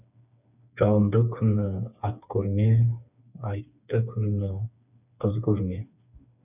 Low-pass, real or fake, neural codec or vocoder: 3.6 kHz; fake; codec, 16 kHz, 4 kbps, FreqCodec, smaller model